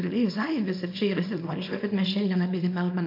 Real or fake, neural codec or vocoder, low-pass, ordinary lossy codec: fake; codec, 24 kHz, 0.9 kbps, WavTokenizer, small release; 5.4 kHz; AAC, 32 kbps